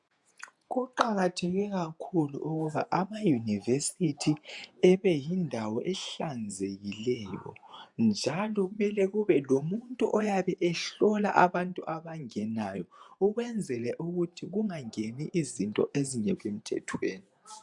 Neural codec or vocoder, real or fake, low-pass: vocoder, 48 kHz, 128 mel bands, Vocos; fake; 10.8 kHz